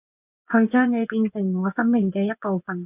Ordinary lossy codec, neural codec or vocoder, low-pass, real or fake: AAC, 32 kbps; vocoder, 24 kHz, 100 mel bands, Vocos; 3.6 kHz; fake